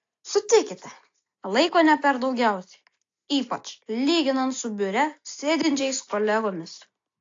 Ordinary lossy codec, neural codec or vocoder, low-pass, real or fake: AAC, 48 kbps; none; 7.2 kHz; real